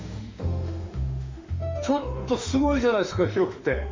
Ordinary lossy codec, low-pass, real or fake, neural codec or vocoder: MP3, 64 kbps; 7.2 kHz; fake; autoencoder, 48 kHz, 32 numbers a frame, DAC-VAE, trained on Japanese speech